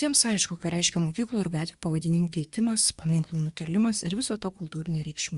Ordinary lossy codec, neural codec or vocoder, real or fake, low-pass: Opus, 64 kbps; codec, 24 kHz, 1 kbps, SNAC; fake; 10.8 kHz